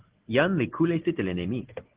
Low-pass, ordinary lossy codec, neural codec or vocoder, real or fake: 3.6 kHz; Opus, 16 kbps; none; real